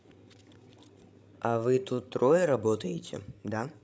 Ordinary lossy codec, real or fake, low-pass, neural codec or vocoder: none; fake; none; codec, 16 kHz, 16 kbps, FreqCodec, larger model